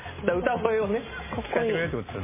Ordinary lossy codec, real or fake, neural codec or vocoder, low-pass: MP3, 16 kbps; real; none; 3.6 kHz